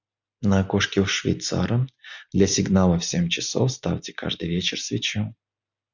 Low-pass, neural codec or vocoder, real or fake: 7.2 kHz; none; real